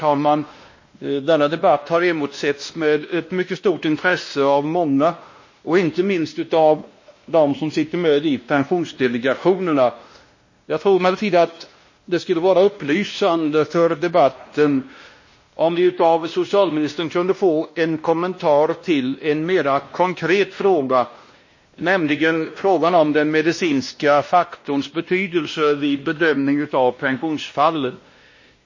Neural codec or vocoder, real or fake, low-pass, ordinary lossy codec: codec, 16 kHz, 1 kbps, X-Codec, WavLM features, trained on Multilingual LibriSpeech; fake; 7.2 kHz; MP3, 32 kbps